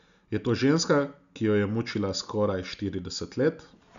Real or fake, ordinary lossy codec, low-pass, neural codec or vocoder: real; none; 7.2 kHz; none